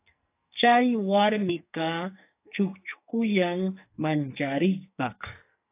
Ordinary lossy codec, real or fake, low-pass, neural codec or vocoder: AAC, 24 kbps; fake; 3.6 kHz; codec, 44.1 kHz, 2.6 kbps, SNAC